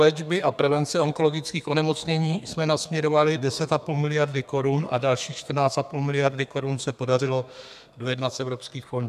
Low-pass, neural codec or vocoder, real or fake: 14.4 kHz; codec, 32 kHz, 1.9 kbps, SNAC; fake